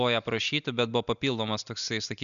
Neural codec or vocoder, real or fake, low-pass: none; real; 7.2 kHz